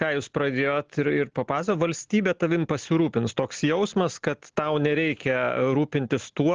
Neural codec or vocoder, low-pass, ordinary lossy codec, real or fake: none; 7.2 kHz; Opus, 16 kbps; real